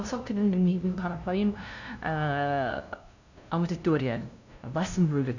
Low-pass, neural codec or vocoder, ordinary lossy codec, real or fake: 7.2 kHz; codec, 16 kHz, 0.5 kbps, FunCodec, trained on LibriTTS, 25 frames a second; none; fake